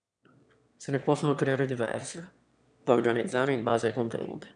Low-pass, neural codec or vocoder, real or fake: 9.9 kHz; autoencoder, 22.05 kHz, a latent of 192 numbers a frame, VITS, trained on one speaker; fake